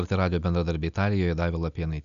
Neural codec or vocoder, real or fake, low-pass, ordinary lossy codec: none; real; 7.2 kHz; MP3, 96 kbps